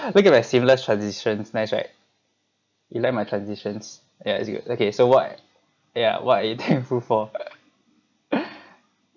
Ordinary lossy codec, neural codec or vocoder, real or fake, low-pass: none; none; real; 7.2 kHz